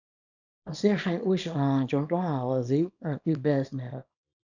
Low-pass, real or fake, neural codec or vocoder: 7.2 kHz; fake; codec, 24 kHz, 0.9 kbps, WavTokenizer, small release